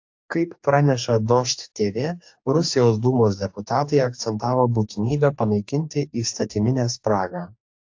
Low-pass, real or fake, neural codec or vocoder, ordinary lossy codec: 7.2 kHz; fake; codec, 44.1 kHz, 2.6 kbps, DAC; AAC, 48 kbps